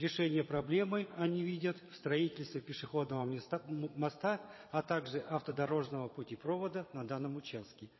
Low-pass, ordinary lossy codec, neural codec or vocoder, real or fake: 7.2 kHz; MP3, 24 kbps; vocoder, 22.05 kHz, 80 mel bands, Vocos; fake